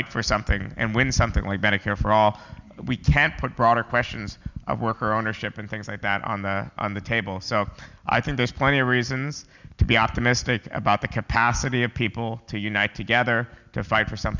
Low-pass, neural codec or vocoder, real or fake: 7.2 kHz; none; real